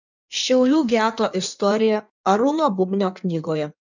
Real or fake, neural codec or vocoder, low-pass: fake; codec, 16 kHz in and 24 kHz out, 1.1 kbps, FireRedTTS-2 codec; 7.2 kHz